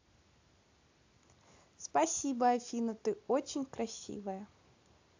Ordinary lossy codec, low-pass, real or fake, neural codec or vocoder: AAC, 48 kbps; 7.2 kHz; real; none